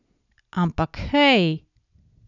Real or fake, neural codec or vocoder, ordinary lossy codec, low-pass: real; none; none; 7.2 kHz